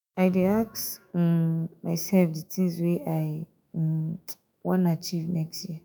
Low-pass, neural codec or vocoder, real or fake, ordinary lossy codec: none; autoencoder, 48 kHz, 128 numbers a frame, DAC-VAE, trained on Japanese speech; fake; none